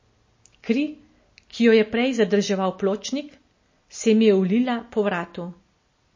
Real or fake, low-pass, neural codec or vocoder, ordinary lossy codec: real; 7.2 kHz; none; MP3, 32 kbps